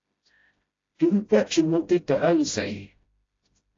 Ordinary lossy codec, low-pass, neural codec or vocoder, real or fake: AAC, 32 kbps; 7.2 kHz; codec, 16 kHz, 0.5 kbps, FreqCodec, smaller model; fake